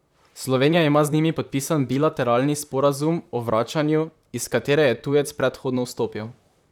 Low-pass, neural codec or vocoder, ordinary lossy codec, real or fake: 19.8 kHz; vocoder, 44.1 kHz, 128 mel bands, Pupu-Vocoder; none; fake